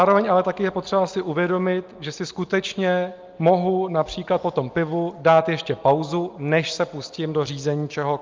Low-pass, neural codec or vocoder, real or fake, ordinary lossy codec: 7.2 kHz; none; real; Opus, 24 kbps